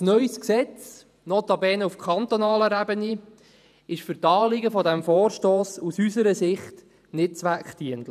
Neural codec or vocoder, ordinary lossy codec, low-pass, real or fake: vocoder, 48 kHz, 128 mel bands, Vocos; none; 14.4 kHz; fake